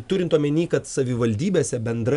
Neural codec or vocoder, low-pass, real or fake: none; 10.8 kHz; real